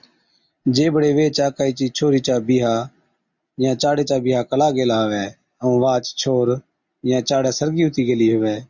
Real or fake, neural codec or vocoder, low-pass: real; none; 7.2 kHz